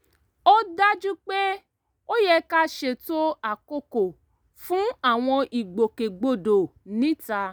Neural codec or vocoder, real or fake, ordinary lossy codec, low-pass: none; real; none; none